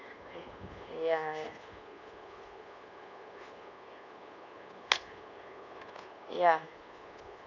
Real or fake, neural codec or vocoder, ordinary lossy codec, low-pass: fake; codec, 24 kHz, 1.2 kbps, DualCodec; none; 7.2 kHz